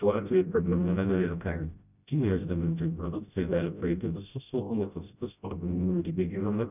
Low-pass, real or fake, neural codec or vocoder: 3.6 kHz; fake; codec, 16 kHz, 0.5 kbps, FreqCodec, smaller model